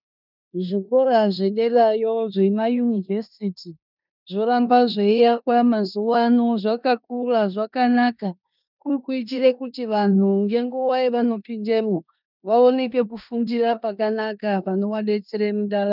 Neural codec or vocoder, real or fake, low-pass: codec, 16 kHz in and 24 kHz out, 0.9 kbps, LongCat-Audio-Codec, four codebook decoder; fake; 5.4 kHz